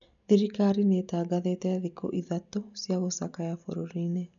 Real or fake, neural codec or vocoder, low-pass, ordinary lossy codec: real; none; 7.2 kHz; none